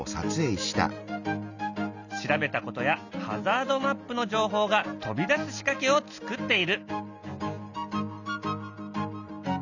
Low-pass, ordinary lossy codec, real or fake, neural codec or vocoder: 7.2 kHz; none; real; none